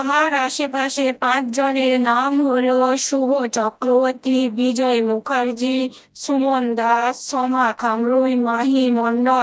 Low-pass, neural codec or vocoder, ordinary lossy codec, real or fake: none; codec, 16 kHz, 1 kbps, FreqCodec, smaller model; none; fake